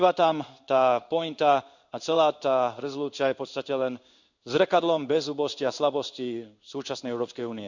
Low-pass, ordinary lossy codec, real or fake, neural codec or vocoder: 7.2 kHz; none; fake; codec, 16 kHz in and 24 kHz out, 1 kbps, XY-Tokenizer